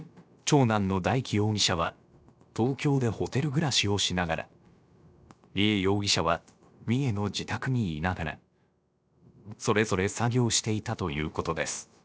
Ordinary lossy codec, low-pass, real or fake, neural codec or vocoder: none; none; fake; codec, 16 kHz, about 1 kbps, DyCAST, with the encoder's durations